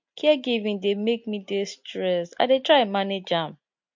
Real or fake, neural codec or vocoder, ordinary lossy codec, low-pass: real; none; MP3, 48 kbps; 7.2 kHz